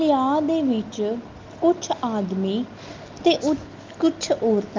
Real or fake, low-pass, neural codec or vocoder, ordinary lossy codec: real; 7.2 kHz; none; Opus, 32 kbps